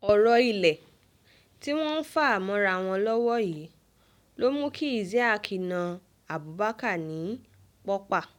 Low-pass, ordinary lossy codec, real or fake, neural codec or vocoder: 19.8 kHz; none; real; none